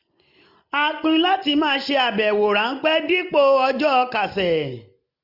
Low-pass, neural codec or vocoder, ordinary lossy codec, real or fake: 5.4 kHz; none; none; real